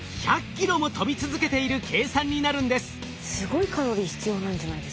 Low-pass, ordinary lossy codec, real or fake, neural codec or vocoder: none; none; real; none